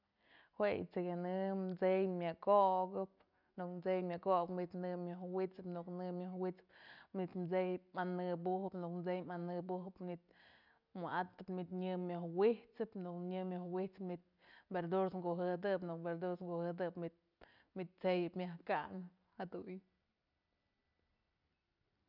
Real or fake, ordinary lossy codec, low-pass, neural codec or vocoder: real; none; 5.4 kHz; none